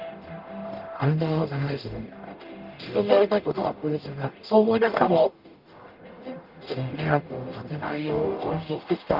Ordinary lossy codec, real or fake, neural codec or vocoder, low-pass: Opus, 24 kbps; fake; codec, 44.1 kHz, 0.9 kbps, DAC; 5.4 kHz